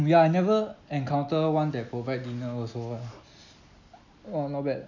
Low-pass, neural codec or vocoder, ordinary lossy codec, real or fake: 7.2 kHz; none; none; real